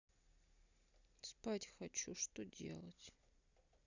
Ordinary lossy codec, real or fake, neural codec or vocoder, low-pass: none; real; none; 7.2 kHz